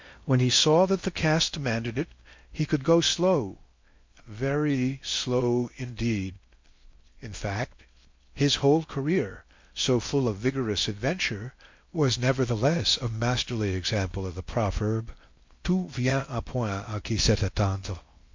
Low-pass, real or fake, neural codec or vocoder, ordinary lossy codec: 7.2 kHz; fake; codec, 16 kHz in and 24 kHz out, 0.6 kbps, FocalCodec, streaming, 2048 codes; MP3, 48 kbps